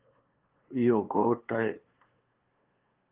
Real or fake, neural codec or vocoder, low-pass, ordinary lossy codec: fake; codec, 16 kHz, 2 kbps, FunCodec, trained on LibriTTS, 25 frames a second; 3.6 kHz; Opus, 16 kbps